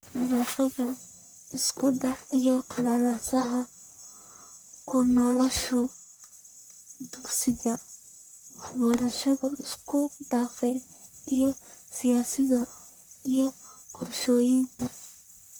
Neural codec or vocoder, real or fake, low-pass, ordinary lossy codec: codec, 44.1 kHz, 1.7 kbps, Pupu-Codec; fake; none; none